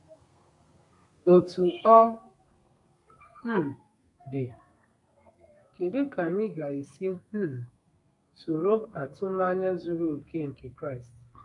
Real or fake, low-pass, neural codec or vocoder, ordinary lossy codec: fake; 10.8 kHz; codec, 32 kHz, 1.9 kbps, SNAC; none